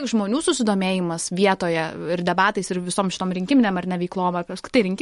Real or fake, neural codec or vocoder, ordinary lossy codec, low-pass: real; none; MP3, 48 kbps; 19.8 kHz